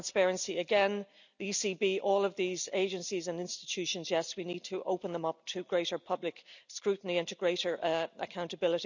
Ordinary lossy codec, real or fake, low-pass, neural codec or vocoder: none; real; 7.2 kHz; none